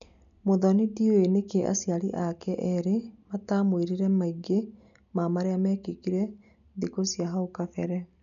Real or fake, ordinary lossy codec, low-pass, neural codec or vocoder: real; none; 7.2 kHz; none